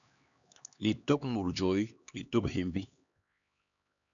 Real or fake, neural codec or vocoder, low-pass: fake; codec, 16 kHz, 2 kbps, X-Codec, HuBERT features, trained on LibriSpeech; 7.2 kHz